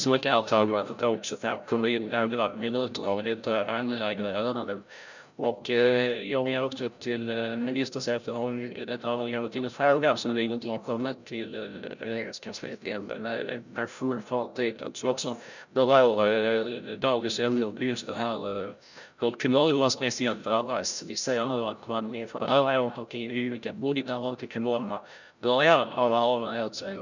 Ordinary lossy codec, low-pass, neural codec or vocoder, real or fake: none; 7.2 kHz; codec, 16 kHz, 0.5 kbps, FreqCodec, larger model; fake